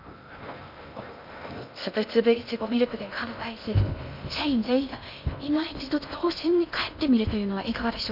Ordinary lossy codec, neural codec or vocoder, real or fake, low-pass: none; codec, 16 kHz in and 24 kHz out, 0.6 kbps, FocalCodec, streaming, 2048 codes; fake; 5.4 kHz